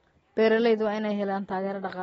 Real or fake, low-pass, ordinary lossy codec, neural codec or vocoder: real; 19.8 kHz; AAC, 24 kbps; none